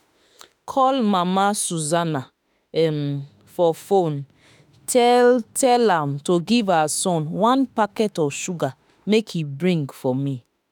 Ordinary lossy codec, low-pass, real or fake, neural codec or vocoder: none; none; fake; autoencoder, 48 kHz, 32 numbers a frame, DAC-VAE, trained on Japanese speech